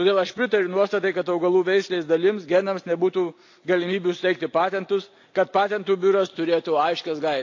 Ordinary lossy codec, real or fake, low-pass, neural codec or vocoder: AAC, 48 kbps; real; 7.2 kHz; none